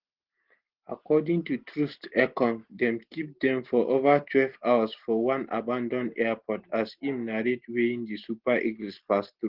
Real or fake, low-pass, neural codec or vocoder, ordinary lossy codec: real; 5.4 kHz; none; Opus, 16 kbps